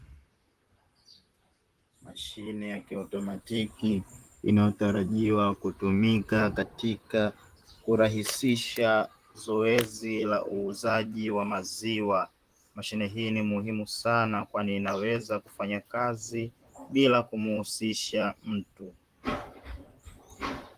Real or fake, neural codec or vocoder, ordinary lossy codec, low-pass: fake; vocoder, 44.1 kHz, 128 mel bands, Pupu-Vocoder; Opus, 24 kbps; 14.4 kHz